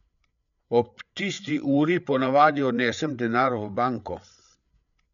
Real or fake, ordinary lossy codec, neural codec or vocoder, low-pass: fake; none; codec, 16 kHz, 8 kbps, FreqCodec, larger model; 7.2 kHz